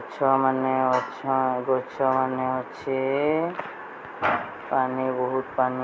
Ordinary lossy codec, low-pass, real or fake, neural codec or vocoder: none; none; real; none